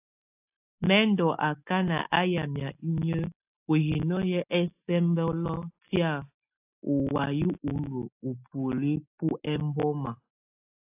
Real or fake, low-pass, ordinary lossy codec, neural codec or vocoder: real; 3.6 kHz; AAC, 32 kbps; none